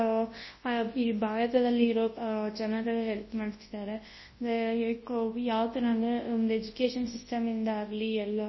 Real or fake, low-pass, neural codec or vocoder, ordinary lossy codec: fake; 7.2 kHz; codec, 24 kHz, 0.9 kbps, WavTokenizer, large speech release; MP3, 24 kbps